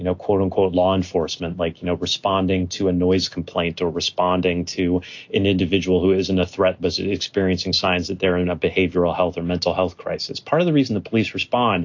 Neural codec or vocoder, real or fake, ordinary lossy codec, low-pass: none; real; AAC, 48 kbps; 7.2 kHz